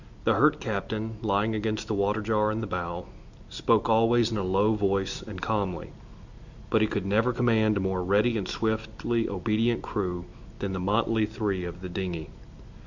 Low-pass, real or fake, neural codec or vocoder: 7.2 kHz; real; none